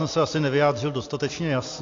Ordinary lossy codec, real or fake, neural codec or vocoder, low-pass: AAC, 48 kbps; real; none; 7.2 kHz